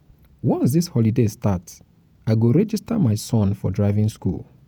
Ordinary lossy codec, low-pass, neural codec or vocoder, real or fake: none; none; vocoder, 48 kHz, 128 mel bands, Vocos; fake